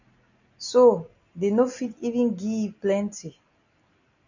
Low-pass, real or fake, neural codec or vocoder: 7.2 kHz; real; none